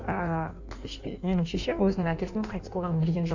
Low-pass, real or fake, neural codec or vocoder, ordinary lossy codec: 7.2 kHz; fake; codec, 16 kHz in and 24 kHz out, 1.1 kbps, FireRedTTS-2 codec; none